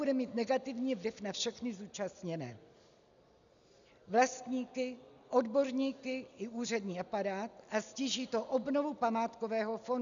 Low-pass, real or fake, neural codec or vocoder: 7.2 kHz; real; none